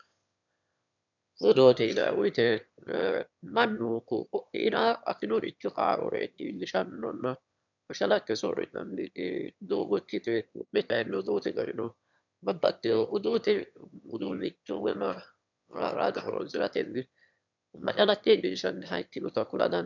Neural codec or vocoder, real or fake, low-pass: autoencoder, 22.05 kHz, a latent of 192 numbers a frame, VITS, trained on one speaker; fake; 7.2 kHz